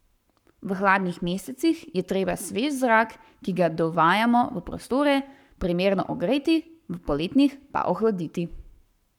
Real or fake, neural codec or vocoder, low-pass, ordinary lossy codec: fake; codec, 44.1 kHz, 7.8 kbps, Pupu-Codec; 19.8 kHz; none